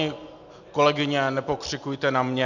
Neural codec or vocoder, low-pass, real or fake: none; 7.2 kHz; real